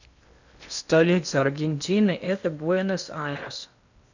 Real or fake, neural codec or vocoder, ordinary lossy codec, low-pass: fake; codec, 16 kHz in and 24 kHz out, 0.8 kbps, FocalCodec, streaming, 65536 codes; none; 7.2 kHz